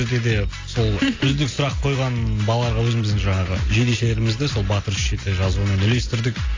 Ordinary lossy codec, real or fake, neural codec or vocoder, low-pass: AAC, 32 kbps; real; none; 7.2 kHz